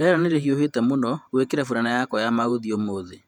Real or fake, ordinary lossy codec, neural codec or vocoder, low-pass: fake; none; vocoder, 48 kHz, 128 mel bands, Vocos; 19.8 kHz